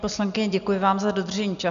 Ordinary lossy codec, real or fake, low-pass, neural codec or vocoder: MP3, 96 kbps; real; 7.2 kHz; none